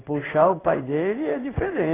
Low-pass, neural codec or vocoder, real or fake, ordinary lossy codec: 3.6 kHz; codec, 16 kHz in and 24 kHz out, 1 kbps, XY-Tokenizer; fake; AAC, 16 kbps